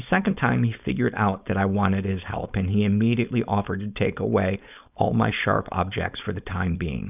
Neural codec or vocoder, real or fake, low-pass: codec, 16 kHz, 4.8 kbps, FACodec; fake; 3.6 kHz